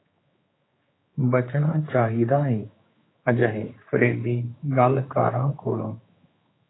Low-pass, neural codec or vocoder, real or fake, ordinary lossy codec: 7.2 kHz; codec, 16 kHz, 4 kbps, X-Codec, HuBERT features, trained on general audio; fake; AAC, 16 kbps